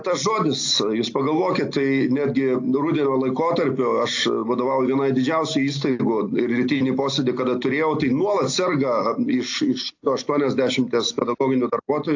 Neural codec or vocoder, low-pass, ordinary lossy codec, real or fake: none; 7.2 kHz; AAC, 48 kbps; real